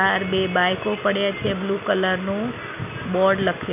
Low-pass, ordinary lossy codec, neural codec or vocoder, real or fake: 3.6 kHz; none; none; real